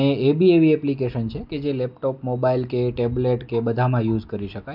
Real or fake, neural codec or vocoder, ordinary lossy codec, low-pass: real; none; MP3, 48 kbps; 5.4 kHz